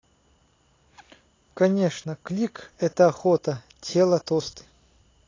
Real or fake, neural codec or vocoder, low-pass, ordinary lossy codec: real; none; 7.2 kHz; AAC, 32 kbps